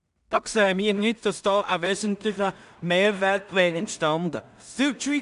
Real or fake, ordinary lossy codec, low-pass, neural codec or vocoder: fake; none; 10.8 kHz; codec, 16 kHz in and 24 kHz out, 0.4 kbps, LongCat-Audio-Codec, two codebook decoder